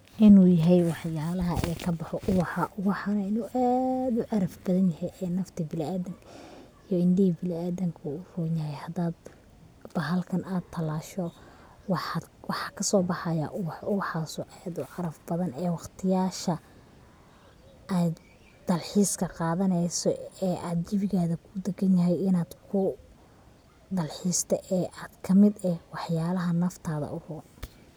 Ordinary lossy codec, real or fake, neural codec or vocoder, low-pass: none; real; none; none